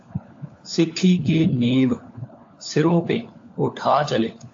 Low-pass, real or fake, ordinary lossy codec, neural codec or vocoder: 7.2 kHz; fake; AAC, 48 kbps; codec, 16 kHz, 4 kbps, FunCodec, trained on LibriTTS, 50 frames a second